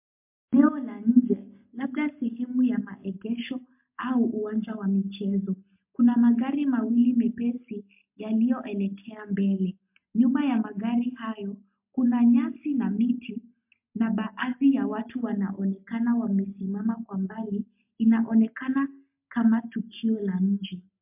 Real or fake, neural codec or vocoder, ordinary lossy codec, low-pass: real; none; MP3, 32 kbps; 3.6 kHz